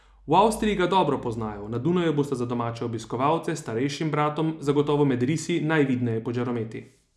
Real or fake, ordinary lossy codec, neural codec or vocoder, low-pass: real; none; none; none